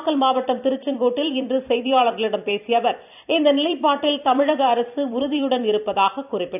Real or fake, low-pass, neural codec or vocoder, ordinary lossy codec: fake; 3.6 kHz; vocoder, 44.1 kHz, 128 mel bands every 256 samples, BigVGAN v2; none